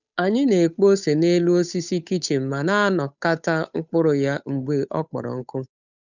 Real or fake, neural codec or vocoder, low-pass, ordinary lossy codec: fake; codec, 16 kHz, 8 kbps, FunCodec, trained on Chinese and English, 25 frames a second; 7.2 kHz; none